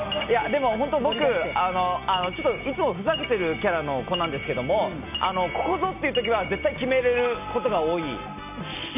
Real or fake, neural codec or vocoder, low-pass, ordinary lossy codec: real; none; 3.6 kHz; none